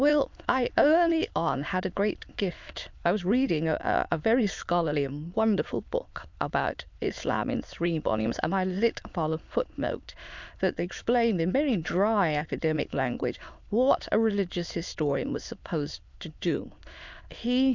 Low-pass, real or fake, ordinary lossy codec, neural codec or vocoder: 7.2 kHz; fake; MP3, 64 kbps; autoencoder, 22.05 kHz, a latent of 192 numbers a frame, VITS, trained on many speakers